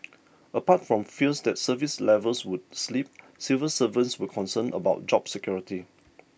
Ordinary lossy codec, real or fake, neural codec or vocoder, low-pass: none; real; none; none